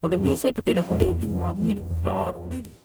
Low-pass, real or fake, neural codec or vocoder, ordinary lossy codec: none; fake; codec, 44.1 kHz, 0.9 kbps, DAC; none